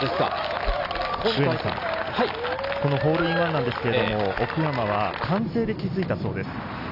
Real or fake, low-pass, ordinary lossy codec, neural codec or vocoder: real; 5.4 kHz; AAC, 48 kbps; none